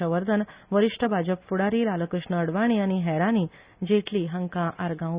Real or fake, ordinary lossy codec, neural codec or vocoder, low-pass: real; Opus, 64 kbps; none; 3.6 kHz